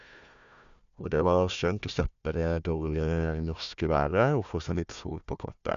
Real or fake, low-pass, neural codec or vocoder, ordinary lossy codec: fake; 7.2 kHz; codec, 16 kHz, 1 kbps, FunCodec, trained on Chinese and English, 50 frames a second; none